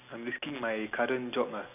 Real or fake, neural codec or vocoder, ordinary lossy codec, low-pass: real; none; none; 3.6 kHz